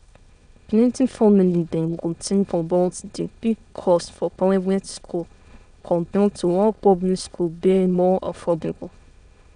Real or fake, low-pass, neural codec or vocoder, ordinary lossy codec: fake; 9.9 kHz; autoencoder, 22.05 kHz, a latent of 192 numbers a frame, VITS, trained on many speakers; none